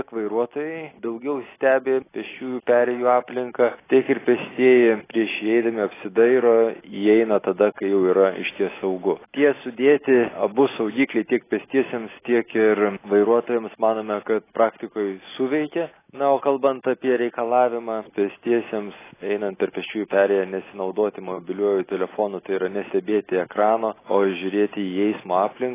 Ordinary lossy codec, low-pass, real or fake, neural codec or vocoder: AAC, 16 kbps; 3.6 kHz; real; none